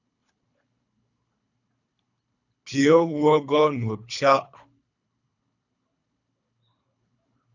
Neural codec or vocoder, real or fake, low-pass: codec, 24 kHz, 3 kbps, HILCodec; fake; 7.2 kHz